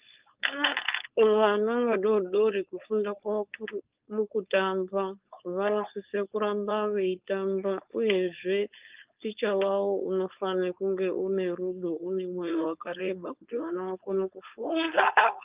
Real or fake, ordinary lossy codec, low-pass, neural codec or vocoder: fake; Opus, 32 kbps; 3.6 kHz; codec, 16 kHz, 4.8 kbps, FACodec